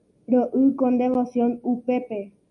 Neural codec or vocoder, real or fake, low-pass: none; real; 10.8 kHz